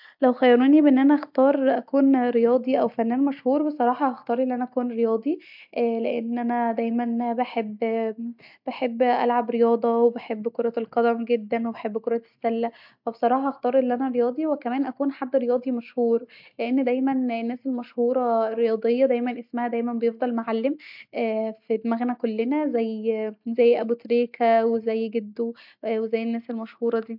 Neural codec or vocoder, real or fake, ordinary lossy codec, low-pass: none; real; MP3, 48 kbps; 5.4 kHz